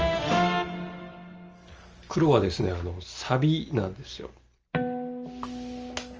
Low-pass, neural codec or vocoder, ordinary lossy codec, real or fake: 7.2 kHz; none; Opus, 24 kbps; real